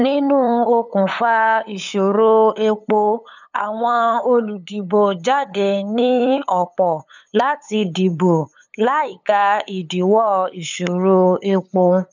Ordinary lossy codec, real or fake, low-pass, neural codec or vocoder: none; fake; 7.2 kHz; codec, 16 kHz, 8 kbps, FunCodec, trained on LibriTTS, 25 frames a second